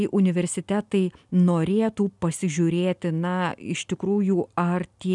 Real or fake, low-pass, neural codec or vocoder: real; 10.8 kHz; none